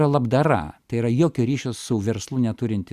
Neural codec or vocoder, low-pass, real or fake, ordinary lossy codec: none; 14.4 kHz; real; Opus, 64 kbps